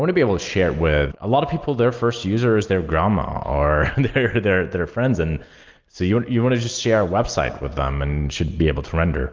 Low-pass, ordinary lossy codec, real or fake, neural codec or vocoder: 7.2 kHz; Opus, 24 kbps; real; none